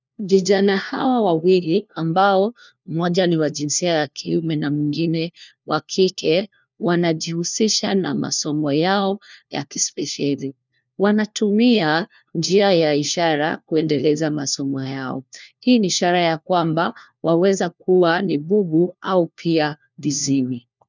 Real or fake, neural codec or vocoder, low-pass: fake; codec, 16 kHz, 1 kbps, FunCodec, trained on LibriTTS, 50 frames a second; 7.2 kHz